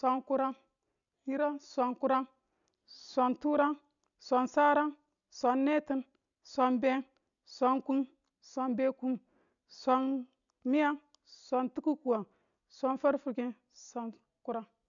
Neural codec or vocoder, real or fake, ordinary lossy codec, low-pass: none; real; none; 7.2 kHz